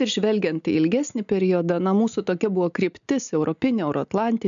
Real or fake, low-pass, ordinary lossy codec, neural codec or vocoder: real; 7.2 kHz; MP3, 96 kbps; none